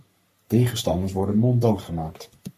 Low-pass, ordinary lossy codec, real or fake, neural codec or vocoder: 14.4 kHz; MP3, 64 kbps; fake; codec, 44.1 kHz, 3.4 kbps, Pupu-Codec